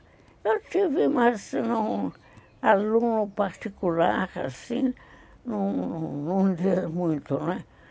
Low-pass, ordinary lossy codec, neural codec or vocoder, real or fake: none; none; none; real